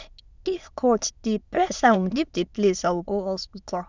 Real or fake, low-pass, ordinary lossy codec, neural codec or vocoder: fake; 7.2 kHz; Opus, 64 kbps; autoencoder, 22.05 kHz, a latent of 192 numbers a frame, VITS, trained on many speakers